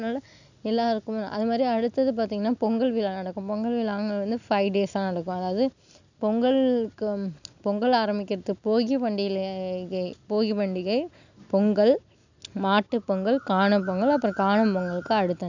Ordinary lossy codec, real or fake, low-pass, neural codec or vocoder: none; real; 7.2 kHz; none